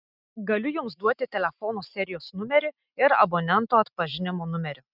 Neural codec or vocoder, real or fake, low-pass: none; real; 5.4 kHz